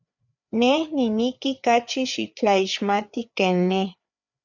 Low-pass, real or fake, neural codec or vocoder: 7.2 kHz; fake; codec, 16 kHz, 4 kbps, FreqCodec, larger model